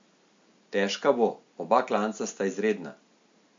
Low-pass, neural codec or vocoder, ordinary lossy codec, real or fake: 7.2 kHz; none; MP3, 48 kbps; real